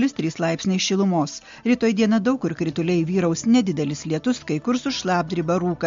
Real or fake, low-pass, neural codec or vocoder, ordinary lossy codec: real; 7.2 kHz; none; MP3, 48 kbps